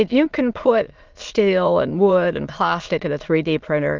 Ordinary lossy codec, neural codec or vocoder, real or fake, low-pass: Opus, 24 kbps; autoencoder, 22.05 kHz, a latent of 192 numbers a frame, VITS, trained on many speakers; fake; 7.2 kHz